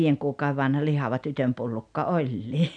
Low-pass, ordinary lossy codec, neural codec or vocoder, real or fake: 9.9 kHz; none; none; real